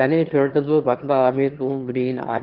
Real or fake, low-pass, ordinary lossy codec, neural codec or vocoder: fake; 5.4 kHz; Opus, 16 kbps; autoencoder, 22.05 kHz, a latent of 192 numbers a frame, VITS, trained on one speaker